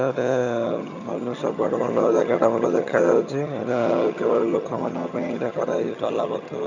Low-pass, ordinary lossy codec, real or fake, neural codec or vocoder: 7.2 kHz; none; fake; vocoder, 22.05 kHz, 80 mel bands, HiFi-GAN